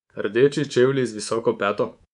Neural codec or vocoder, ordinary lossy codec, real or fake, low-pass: codec, 24 kHz, 3.1 kbps, DualCodec; none; fake; 10.8 kHz